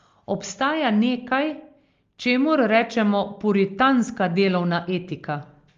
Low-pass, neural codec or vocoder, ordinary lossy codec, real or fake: 7.2 kHz; none; Opus, 32 kbps; real